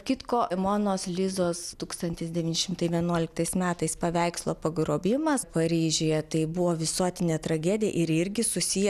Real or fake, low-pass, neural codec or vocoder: real; 14.4 kHz; none